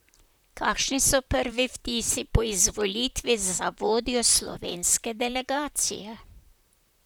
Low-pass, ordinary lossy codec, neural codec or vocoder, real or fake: none; none; vocoder, 44.1 kHz, 128 mel bands, Pupu-Vocoder; fake